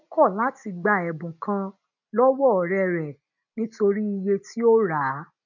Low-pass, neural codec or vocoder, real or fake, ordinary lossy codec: 7.2 kHz; none; real; none